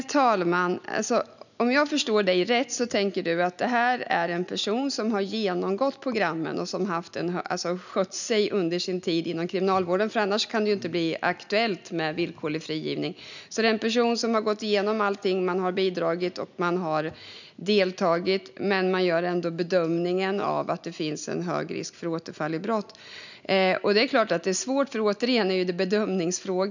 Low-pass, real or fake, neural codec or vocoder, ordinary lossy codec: 7.2 kHz; real; none; none